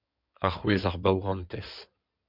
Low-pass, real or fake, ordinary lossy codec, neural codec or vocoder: 5.4 kHz; fake; MP3, 48 kbps; codec, 16 kHz in and 24 kHz out, 2.2 kbps, FireRedTTS-2 codec